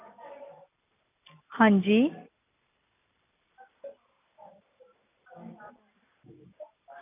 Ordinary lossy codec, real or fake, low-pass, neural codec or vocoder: none; real; 3.6 kHz; none